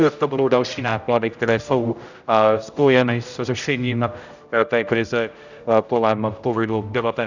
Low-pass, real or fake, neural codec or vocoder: 7.2 kHz; fake; codec, 16 kHz, 0.5 kbps, X-Codec, HuBERT features, trained on general audio